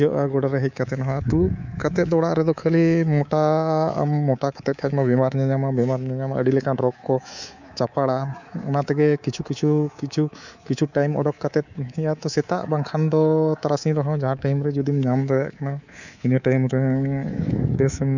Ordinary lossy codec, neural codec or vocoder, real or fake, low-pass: none; codec, 24 kHz, 3.1 kbps, DualCodec; fake; 7.2 kHz